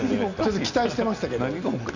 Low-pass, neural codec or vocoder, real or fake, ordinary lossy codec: 7.2 kHz; none; real; none